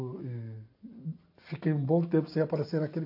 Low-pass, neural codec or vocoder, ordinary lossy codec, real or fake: 5.4 kHz; none; AAC, 32 kbps; real